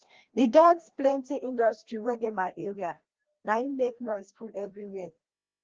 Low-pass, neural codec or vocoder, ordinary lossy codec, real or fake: 7.2 kHz; codec, 16 kHz, 1 kbps, FreqCodec, larger model; Opus, 16 kbps; fake